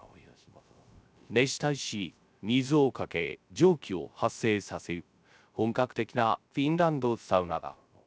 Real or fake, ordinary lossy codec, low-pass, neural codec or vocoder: fake; none; none; codec, 16 kHz, 0.3 kbps, FocalCodec